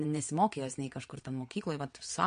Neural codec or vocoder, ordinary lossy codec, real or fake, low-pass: vocoder, 22.05 kHz, 80 mel bands, WaveNeXt; MP3, 48 kbps; fake; 9.9 kHz